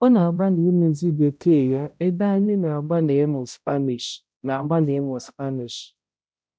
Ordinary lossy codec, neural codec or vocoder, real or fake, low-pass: none; codec, 16 kHz, 0.5 kbps, X-Codec, HuBERT features, trained on balanced general audio; fake; none